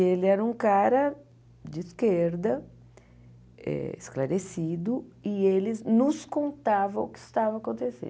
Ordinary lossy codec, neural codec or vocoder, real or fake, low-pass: none; none; real; none